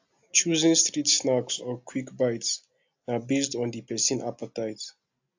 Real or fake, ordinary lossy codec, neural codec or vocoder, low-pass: real; AAC, 48 kbps; none; 7.2 kHz